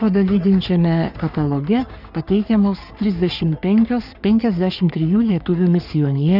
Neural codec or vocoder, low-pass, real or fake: codec, 44.1 kHz, 3.4 kbps, Pupu-Codec; 5.4 kHz; fake